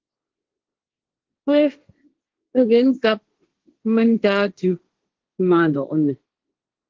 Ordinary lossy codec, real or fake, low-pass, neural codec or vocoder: Opus, 16 kbps; fake; 7.2 kHz; codec, 16 kHz, 1.1 kbps, Voila-Tokenizer